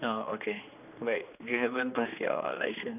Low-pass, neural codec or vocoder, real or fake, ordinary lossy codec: 3.6 kHz; codec, 16 kHz, 2 kbps, X-Codec, HuBERT features, trained on general audio; fake; none